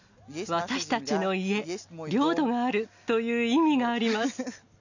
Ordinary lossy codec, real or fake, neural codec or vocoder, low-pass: none; real; none; 7.2 kHz